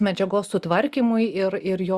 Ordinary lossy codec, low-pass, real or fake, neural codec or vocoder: Opus, 64 kbps; 14.4 kHz; real; none